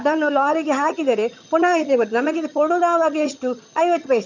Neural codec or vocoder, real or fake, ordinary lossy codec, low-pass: vocoder, 22.05 kHz, 80 mel bands, HiFi-GAN; fake; none; 7.2 kHz